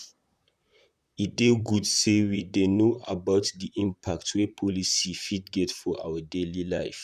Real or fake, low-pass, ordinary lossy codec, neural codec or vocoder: fake; 14.4 kHz; none; vocoder, 44.1 kHz, 128 mel bands, Pupu-Vocoder